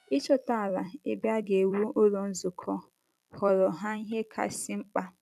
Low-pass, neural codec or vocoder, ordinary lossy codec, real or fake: 14.4 kHz; vocoder, 44.1 kHz, 128 mel bands every 256 samples, BigVGAN v2; none; fake